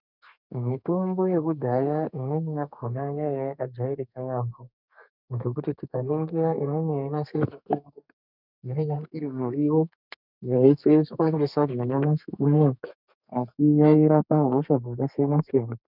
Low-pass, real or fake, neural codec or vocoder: 5.4 kHz; fake; codec, 32 kHz, 1.9 kbps, SNAC